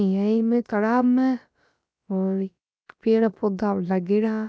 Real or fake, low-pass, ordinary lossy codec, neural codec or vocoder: fake; none; none; codec, 16 kHz, about 1 kbps, DyCAST, with the encoder's durations